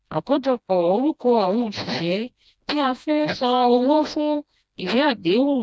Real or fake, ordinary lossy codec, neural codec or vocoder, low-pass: fake; none; codec, 16 kHz, 1 kbps, FreqCodec, smaller model; none